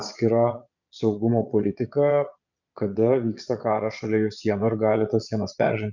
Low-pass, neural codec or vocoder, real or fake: 7.2 kHz; vocoder, 22.05 kHz, 80 mel bands, Vocos; fake